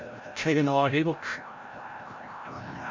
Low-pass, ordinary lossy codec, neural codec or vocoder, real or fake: 7.2 kHz; MP3, 48 kbps; codec, 16 kHz, 0.5 kbps, FreqCodec, larger model; fake